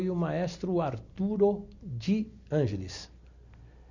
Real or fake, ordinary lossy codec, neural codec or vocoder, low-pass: real; none; none; 7.2 kHz